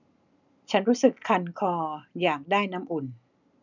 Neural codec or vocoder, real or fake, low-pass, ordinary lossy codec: none; real; 7.2 kHz; none